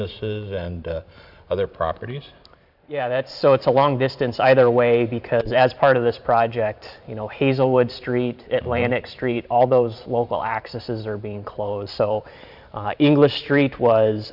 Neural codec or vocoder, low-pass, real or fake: vocoder, 44.1 kHz, 128 mel bands every 512 samples, BigVGAN v2; 5.4 kHz; fake